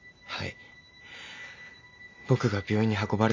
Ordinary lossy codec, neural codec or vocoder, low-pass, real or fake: MP3, 64 kbps; none; 7.2 kHz; real